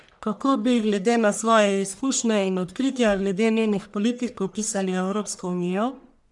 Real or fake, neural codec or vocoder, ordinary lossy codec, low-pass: fake; codec, 44.1 kHz, 1.7 kbps, Pupu-Codec; none; 10.8 kHz